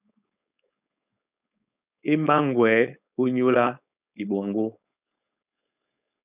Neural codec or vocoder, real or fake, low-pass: codec, 16 kHz, 4.8 kbps, FACodec; fake; 3.6 kHz